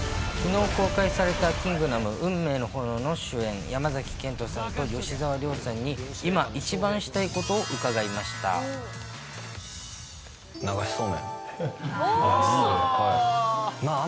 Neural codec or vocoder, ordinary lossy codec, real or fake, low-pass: none; none; real; none